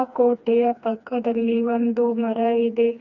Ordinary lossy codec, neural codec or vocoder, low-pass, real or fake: none; codec, 16 kHz, 2 kbps, FreqCodec, smaller model; 7.2 kHz; fake